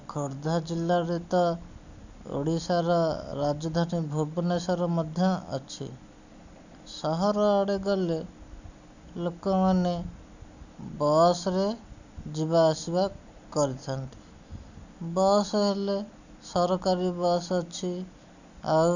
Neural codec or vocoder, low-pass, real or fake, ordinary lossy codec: none; 7.2 kHz; real; none